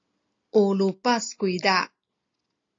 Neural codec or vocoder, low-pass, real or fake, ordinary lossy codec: none; 7.2 kHz; real; AAC, 32 kbps